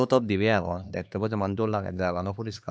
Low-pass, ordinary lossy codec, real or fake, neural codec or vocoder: none; none; fake; codec, 16 kHz, 2 kbps, X-Codec, HuBERT features, trained on LibriSpeech